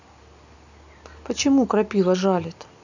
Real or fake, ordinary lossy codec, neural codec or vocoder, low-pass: real; none; none; 7.2 kHz